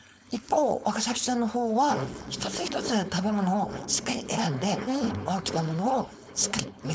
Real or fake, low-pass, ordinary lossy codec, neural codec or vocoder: fake; none; none; codec, 16 kHz, 4.8 kbps, FACodec